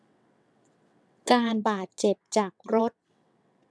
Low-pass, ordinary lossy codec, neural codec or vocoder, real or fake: none; none; vocoder, 22.05 kHz, 80 mel bands, WaveNeXt; fake